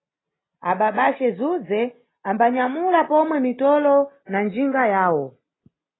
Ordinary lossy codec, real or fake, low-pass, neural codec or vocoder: AAC, 16 kbps; real; 7.2 kHz; none